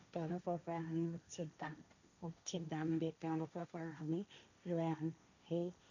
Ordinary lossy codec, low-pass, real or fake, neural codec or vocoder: none; 7.2 kHz; fake; codec, 16 kHz, 1.1 kbps, Voila-Tokenizer